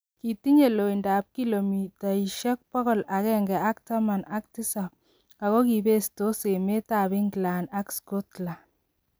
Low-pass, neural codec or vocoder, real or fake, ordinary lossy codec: none; none; real; none